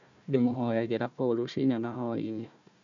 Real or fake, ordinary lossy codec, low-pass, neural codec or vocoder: fake; none; 7.2 kHz; codec, 16 kHz, 1 kbps, FunCodec, trained on Chinese and English, 50 frames a second